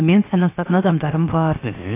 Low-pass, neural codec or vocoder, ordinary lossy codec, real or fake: 3.6 kHz; codec, 16 kHz, 0.7 kbps, FocalCodec; AAC, 24 kbps; fake